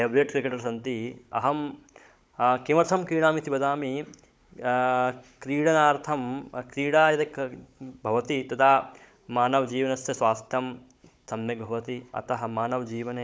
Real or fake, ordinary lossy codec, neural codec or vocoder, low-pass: fake; none; codec, 16 kHz, 16 kbps, FunCodec, trained on Chinese and English, 50 frames a second; none